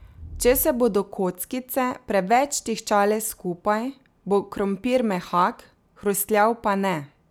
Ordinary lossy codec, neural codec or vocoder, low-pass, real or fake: none; none; none; real